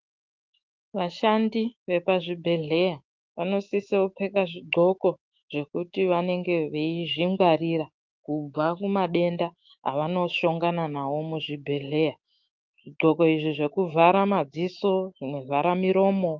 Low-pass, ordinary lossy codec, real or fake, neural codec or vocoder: 7.2 kHz; Opus, 32 kbps; fake; autoencoder, 48 kHz, 128 numbers a frame, DAC-VAE, trained on Japanese speech